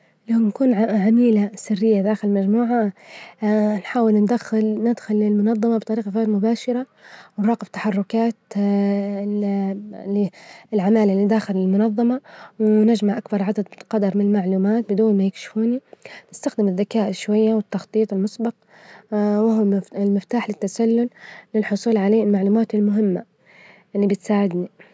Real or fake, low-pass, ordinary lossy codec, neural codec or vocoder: real; none; none; none